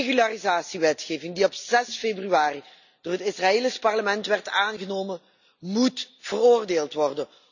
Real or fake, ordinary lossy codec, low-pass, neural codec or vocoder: real; none; 7.2 kHz; none